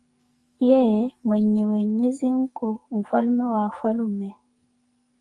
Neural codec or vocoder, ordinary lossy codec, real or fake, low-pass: codec, 44.1 kHz, 2.6 kbps, SNAC; Opus, 32 kbps; fake; 10.8 kHz